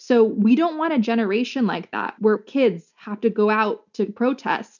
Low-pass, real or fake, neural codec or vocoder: 7.2 kHz; real; none